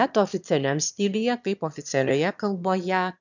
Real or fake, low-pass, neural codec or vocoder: fake; 7.2 kHz; autoencoder, 22.05 kHz, a latent of 192 numbers a frame, VITS, trained on one speaker